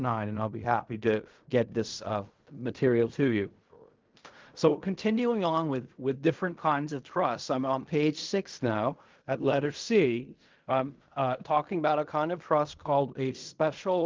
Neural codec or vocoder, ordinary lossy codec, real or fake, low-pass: codec, 16 kHz in and 24 kHz out, 0.4 kbps, LongCat-Audio-Codec, fine tuned four codebook decoder; Opus, 32 kbps; fake; 7.2 kHz